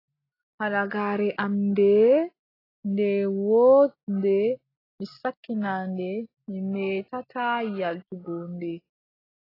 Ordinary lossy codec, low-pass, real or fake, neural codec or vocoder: AAC, 24 kbps; 5.4 kHz; real; none